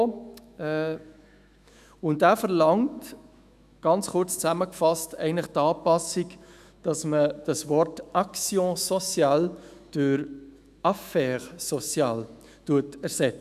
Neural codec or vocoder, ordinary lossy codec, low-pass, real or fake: autoencoder, 48 kHz, 128 numbers a frame, DAC-VAE, trained on Japanese speech; none; 14.4 kHz; fake